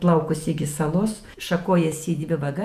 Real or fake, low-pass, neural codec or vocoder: fake; 14.4 kHz; vocoder, 48 kHz, 128 mel bands, Vocos